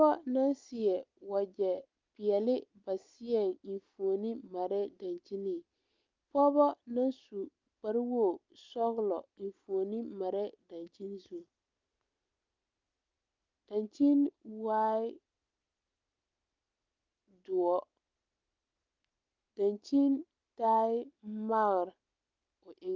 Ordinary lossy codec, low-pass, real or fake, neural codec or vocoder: Opus, 32 kbps; 7.2 kHz; real; none